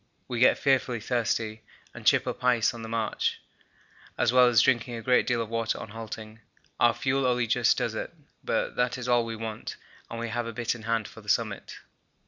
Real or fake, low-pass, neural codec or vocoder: real; 7.2 kHz; none